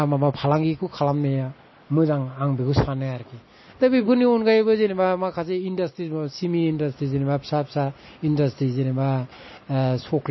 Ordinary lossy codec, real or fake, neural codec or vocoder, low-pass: MP3, 24 kbps; real; none; 7.2 kHz